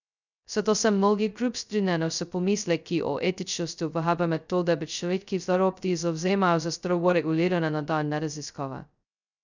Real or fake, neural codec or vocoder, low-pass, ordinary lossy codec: fake; codec, 16 kHz, 0.2 kbps, FocalCodec; 7.2 kHz; none